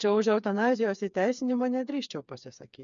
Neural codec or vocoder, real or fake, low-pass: codec, 16 kHz, 4 kbps, FreqCodec, smaller model; fake; 7.2 kHz